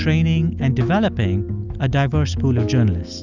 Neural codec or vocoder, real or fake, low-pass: none; real; 7.2 kHz